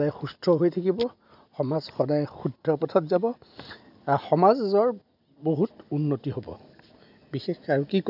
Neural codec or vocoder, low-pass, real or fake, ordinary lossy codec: none; 5.4 kHz; real; none